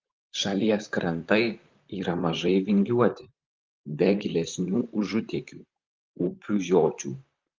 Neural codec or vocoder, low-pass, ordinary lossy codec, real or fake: vocoder, 44.1 kHz, 128 mel bands, Pupu-Vocoder; 7.2 kHz; Opus, 24 kbps; fake